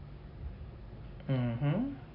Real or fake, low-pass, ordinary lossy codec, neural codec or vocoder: real; 5.4 kHz; MP3, 32 kbps; none